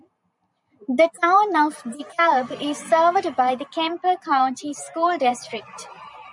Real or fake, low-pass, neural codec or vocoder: fake; 10.8 kHz; vocoder, 44.1 kHz, 128 mel bands every 512 samples, BigVGAN v2